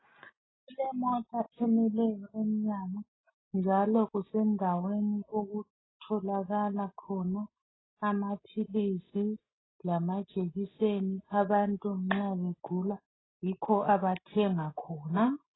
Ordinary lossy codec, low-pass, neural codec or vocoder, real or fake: AAC, 16 kbps; 7.2 kHz; none; real